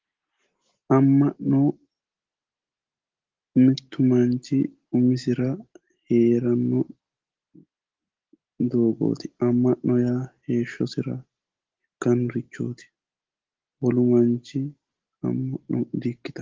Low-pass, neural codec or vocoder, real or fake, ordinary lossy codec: 7.2 kHz; none; real; Opus, 16 kbps